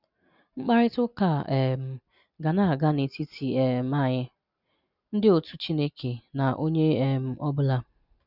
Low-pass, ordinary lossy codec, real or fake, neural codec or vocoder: 5.4 kHz; none; real; none